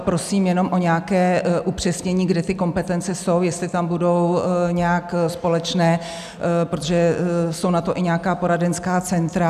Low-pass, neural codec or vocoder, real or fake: 14.4 kHz; none; real